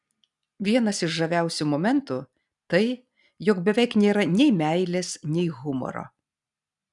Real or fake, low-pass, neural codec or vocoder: real; 10.8 kHz; none